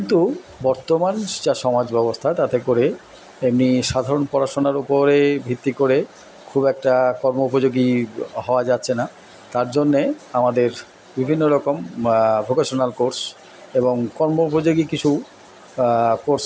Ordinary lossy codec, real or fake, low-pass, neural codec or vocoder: none; real; none; none